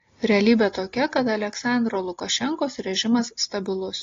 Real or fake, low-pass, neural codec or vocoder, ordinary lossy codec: real; 7.2 kHz; none; AAC, 32 kbps